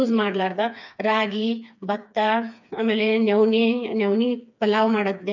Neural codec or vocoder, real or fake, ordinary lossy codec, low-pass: codec, 16 kHz, 4 kbps, FreqCodec, smaller model; fake; none; 7.2 kHz